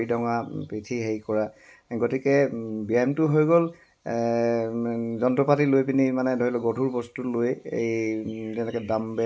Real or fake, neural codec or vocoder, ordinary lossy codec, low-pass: real; none; none; none